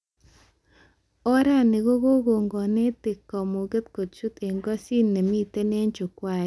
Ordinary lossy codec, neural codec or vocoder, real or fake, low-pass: none; none; real; none